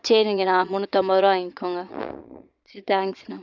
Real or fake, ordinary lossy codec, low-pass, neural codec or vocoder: fake; none; 7.2 kHz; vocoder, 22.05 kHz, 80 mel bands, Vocos